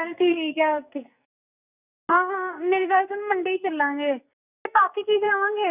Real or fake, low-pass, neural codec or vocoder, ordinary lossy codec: fake; 3.6 kHz; codec, 44.1 kHz, 2.6 kbps, SNAC; Opus, 64 kbps